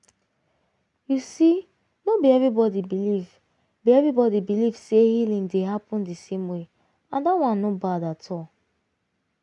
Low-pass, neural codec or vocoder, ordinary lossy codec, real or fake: 10.8 kHz; none; none; real